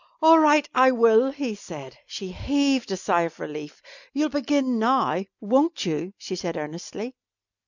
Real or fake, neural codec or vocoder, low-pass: real; none; 7.2 kHz